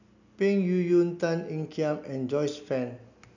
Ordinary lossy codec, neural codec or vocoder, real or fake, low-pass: none; none; real; 7.2 kHz